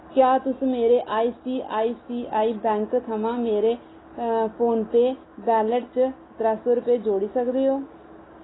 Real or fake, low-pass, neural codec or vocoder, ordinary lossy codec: real; 7.2 kHz; none; AAC, 16 kbps